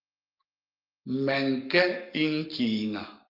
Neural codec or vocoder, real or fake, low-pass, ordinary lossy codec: codec, 44.1 kHz, 7.8 kbps, Pupu-Codec; fake; 5.4 kHz; Opus, 24 kbps